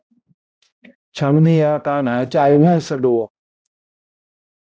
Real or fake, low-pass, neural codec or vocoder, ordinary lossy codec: fake; none; codec, 16 kHz, 0.5 kbps, X-Codec, HuBERT features, trained on balanced general audio; none